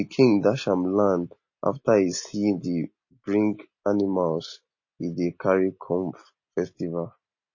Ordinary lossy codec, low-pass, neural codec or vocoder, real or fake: MP3, 32 kbps; 7.2 kHz; none; real